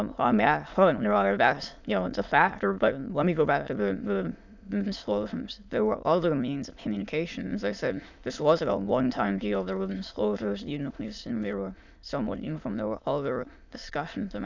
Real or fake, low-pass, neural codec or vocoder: fake; 7.2 kHz; autoencoder, 22.05 kHz, a latent of 192 numbers a frame, VITS, trained on many speakers